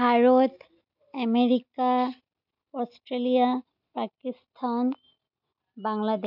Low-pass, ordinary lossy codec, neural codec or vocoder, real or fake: 5.4 kHz; none; none; real